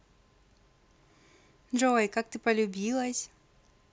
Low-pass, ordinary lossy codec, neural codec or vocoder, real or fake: none; none; none; real